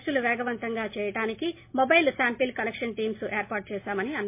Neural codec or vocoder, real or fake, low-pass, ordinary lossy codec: none; real; 3.6 kHz; MP3, 24 kbps